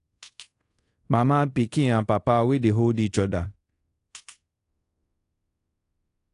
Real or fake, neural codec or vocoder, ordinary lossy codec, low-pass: fake; codec, 24 kHz, 0.5 kbps, DualCodec; AAC, 48 kbps; 10.8 kHz